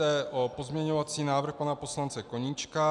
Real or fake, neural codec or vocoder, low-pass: real; none; 10.8 kHz